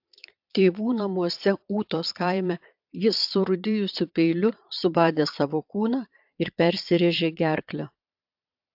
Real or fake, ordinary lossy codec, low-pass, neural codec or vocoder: fake; AAC, 48 kbps; 5.4 kHz; vocoder, 22.05 kHz, 80 mel bands, Vocos